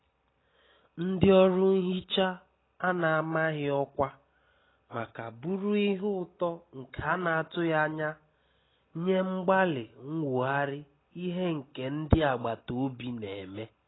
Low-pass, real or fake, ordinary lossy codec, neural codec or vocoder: 7.2 kHz; real; AAC, 16 kbps; none